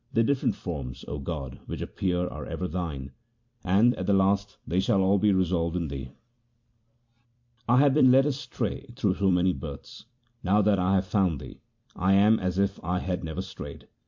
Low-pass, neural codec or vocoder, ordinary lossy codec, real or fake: 7.2 kHz; vocoder, 44.1 kHz, 128 mel bands every 256 samples, BigVGAN v2; MP3, 48 kbps; fake